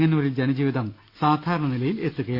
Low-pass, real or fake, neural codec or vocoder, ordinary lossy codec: 5.4 kHz; real; none; Opus, 64 kbps